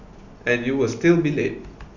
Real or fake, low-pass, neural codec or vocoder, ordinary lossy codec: real; 7.2 kHz; none; none